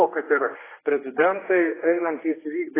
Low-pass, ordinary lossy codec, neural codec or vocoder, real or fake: 3.6 kHz; AAC, 16 kbps; codec, 16 kHz, 2 kbps, X-Codec, HuBERT features, trained on general audio; fake